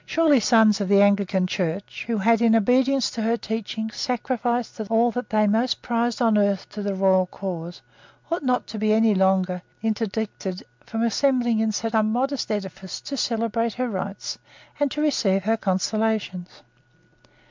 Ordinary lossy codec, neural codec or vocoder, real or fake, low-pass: MP3, 64 kbps; none; real; 7.2 kHz